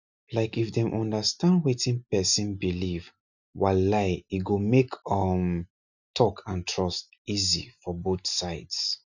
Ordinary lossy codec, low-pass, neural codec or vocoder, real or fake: none; 7.2 kHz; none; real